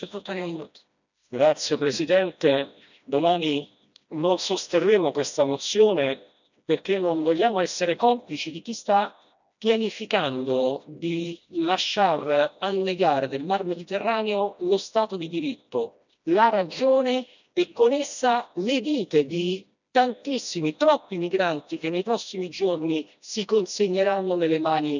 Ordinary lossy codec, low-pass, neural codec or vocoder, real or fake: none; 7.2 kHz; codec, 16 kHz, 1 kbps, FreqCodec, smaller model; fake